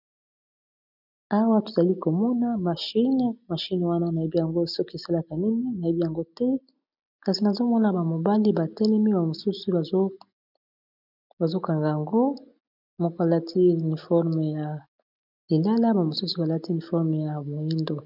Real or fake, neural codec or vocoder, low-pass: real; none; 5.4 kHz